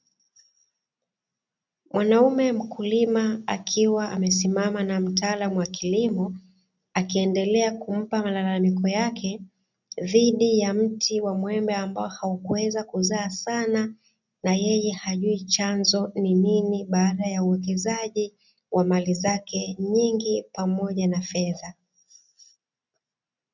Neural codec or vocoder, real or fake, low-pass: none; real; 7.2 kHz